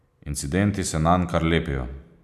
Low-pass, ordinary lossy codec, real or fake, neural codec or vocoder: 14.4 kHz; none; real; none